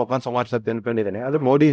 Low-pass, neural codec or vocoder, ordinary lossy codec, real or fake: none; codec, 16 kHz, 0.5 kbps, X-Codec, HuBERT features, trained on LibriSpeech; none; fake